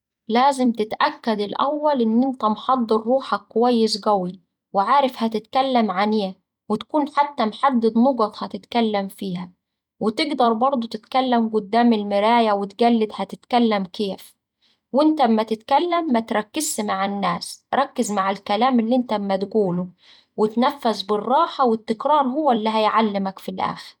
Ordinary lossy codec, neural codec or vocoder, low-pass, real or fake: none; vocoder, 44.1 kHz, 128 mel bands every 256 samples, BigVGAN v2; 19.8 kHz; fake